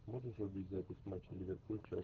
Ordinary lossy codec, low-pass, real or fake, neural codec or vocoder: Opus, 16 kbps; 7.2 kHz; fake; codec, 24 kHz, 6 kbps, HILCodec